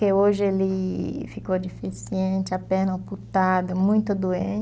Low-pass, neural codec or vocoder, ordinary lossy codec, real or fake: none; none; none; real